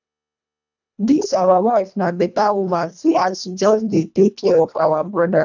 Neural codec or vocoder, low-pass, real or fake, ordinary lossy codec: codec, 24 kHz, 1.5 kbps, HILCodec; 7.2 kHz; fake; none